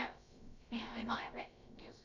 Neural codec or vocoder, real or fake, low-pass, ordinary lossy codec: codec, 16 kHz, about 1 kbps, DyCAST, with the encoder's durations; fake; 7.2 kHz; none